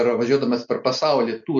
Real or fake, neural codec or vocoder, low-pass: real; none; 7.2 kHz